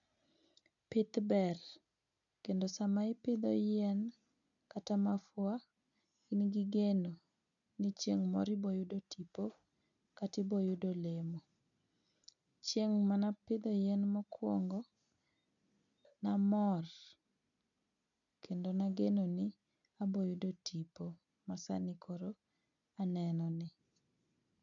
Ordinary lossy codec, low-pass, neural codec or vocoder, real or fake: none; 7.2 kHz; none; real